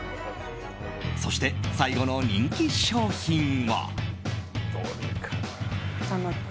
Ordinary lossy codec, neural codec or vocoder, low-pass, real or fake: none; none; none; real